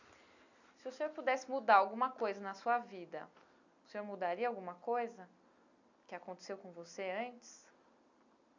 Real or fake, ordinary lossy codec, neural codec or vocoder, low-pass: real; none; none; 7.2 kHz